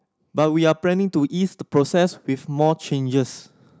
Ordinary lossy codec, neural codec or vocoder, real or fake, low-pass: none; none; real; none